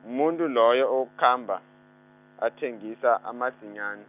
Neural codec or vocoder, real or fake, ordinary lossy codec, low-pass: none; real; none; 3.6 kHz